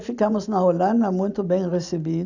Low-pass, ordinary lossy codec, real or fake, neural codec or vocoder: 7.2 kHz; none; fake; vocoder, 44.1 kHz, 128 mel bands every 512 samples, BigVGAN v2